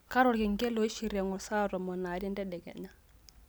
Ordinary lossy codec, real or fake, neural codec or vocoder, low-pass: none; real; none; none